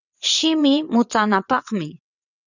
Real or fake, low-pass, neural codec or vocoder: fake; 7.2 kHz; vocoder, 22.05 kHz, 80 mel bands, WaveNeXt